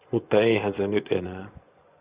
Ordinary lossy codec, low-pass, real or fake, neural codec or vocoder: Opus, 64 kbps; 3.6 kHz; fake; vocoder, 24 kHz, 100 mel bands, Vocos